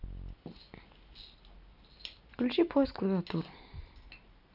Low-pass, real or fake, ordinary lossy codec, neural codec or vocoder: 5.4 kHz; real; none; none